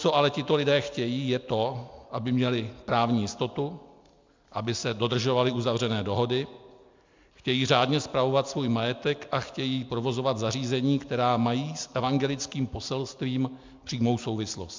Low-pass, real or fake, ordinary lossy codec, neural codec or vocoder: 7.2 kHz; real; MP3, 64 kbps; none